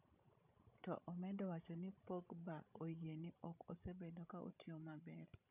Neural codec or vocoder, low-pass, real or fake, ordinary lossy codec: codec, 16 kHz, 16 kbps, FreqCodec, larger model; 3.6 kHz; fake; none